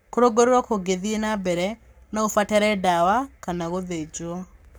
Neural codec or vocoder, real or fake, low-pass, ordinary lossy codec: codec, 44.1 kHz, 7.8 kbps, Pupu-Codec; fake; none; none